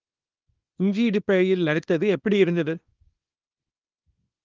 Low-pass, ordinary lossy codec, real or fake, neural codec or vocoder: 7.2 kHz; Opus, 24 kbps; fake; codec, 24 kHz, 0.9 kbps, WavTokenizer, small release